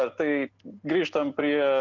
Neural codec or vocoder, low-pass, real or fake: none; 7.2 kHz; real